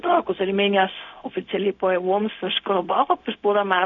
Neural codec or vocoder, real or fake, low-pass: codec, 16 kHz, 0.4 kbps, LongCat-Audio-Codec; fake; 7.2 kHz